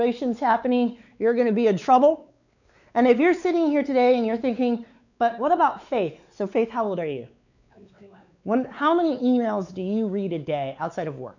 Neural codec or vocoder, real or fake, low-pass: codec, 16 kHz, 4 kbps, X-Codec, WavLM features, trained on Multilingual LibriSpeech; fake; 7.2 kHz